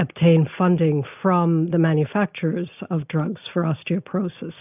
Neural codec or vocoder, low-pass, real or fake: none; 3.6 kHz; real